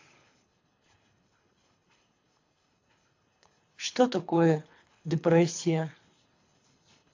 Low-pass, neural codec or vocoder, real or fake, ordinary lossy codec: 7.2 kHz; codec, 24 kHz, 3 kbps, HILCodec; fake; AAC, 48 kbps